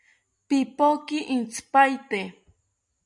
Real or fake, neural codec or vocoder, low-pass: real; none; 10.8 kHz